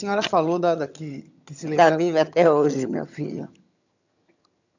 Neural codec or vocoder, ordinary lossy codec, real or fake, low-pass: vocoder, 22.05 kHz, 80 mel bands, HiFi-GAN; none; fake; 7.2 kHz